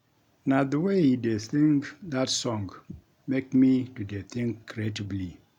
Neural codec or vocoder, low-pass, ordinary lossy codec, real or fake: none; 19.8 kHz; none; real